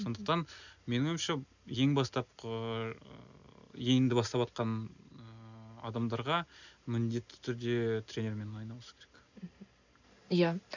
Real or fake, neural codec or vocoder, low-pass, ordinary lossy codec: real; none; 7.2 kHz; none